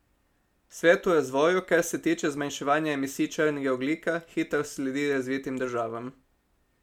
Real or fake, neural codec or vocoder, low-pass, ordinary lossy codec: real; none; 19.8 kHz; MP3, 96 kbps